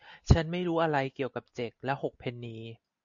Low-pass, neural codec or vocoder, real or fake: 7.2 kHz; none; real